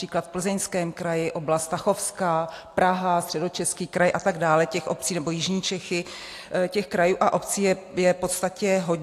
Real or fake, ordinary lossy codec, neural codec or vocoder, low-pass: real; AAC, 64 kbps; none; 14.4 kHz